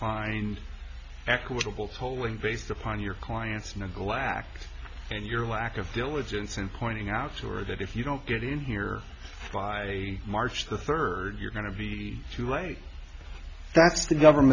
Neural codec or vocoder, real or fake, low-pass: none; real; 7.2 kHz